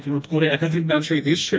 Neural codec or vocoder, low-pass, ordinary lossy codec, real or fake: codec, 16 kHz, 1 kbps, FreqCodec, smaller model; none; none; fake